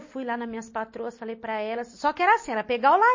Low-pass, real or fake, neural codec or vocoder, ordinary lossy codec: 7.2 kHz; real; none; MP3, 32 kbps